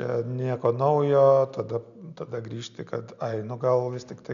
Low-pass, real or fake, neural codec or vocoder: 7.2 kHz; real; none